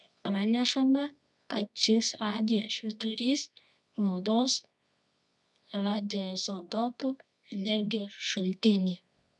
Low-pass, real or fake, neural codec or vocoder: 10.8 kHz; fake; codec, 24 kHz, 0.9 kbps, WavTokenizer, medium music audio release